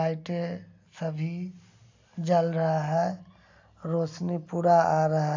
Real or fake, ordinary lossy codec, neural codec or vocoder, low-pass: real; AAC, 48 kbps; none; 7.2 kHz